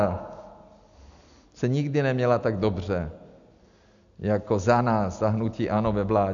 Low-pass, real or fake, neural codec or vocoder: 7.2 kHz; real; none